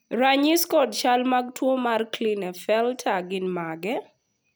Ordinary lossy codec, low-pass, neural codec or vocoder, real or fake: none; none; none; real